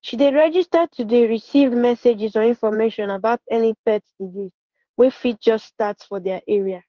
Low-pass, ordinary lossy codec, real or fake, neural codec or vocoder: 7.2 kHz; Opus, 32 kbps; fake; codec, 16 kHz in and 24 kHz out, 1 kbps, XY-Tokenizer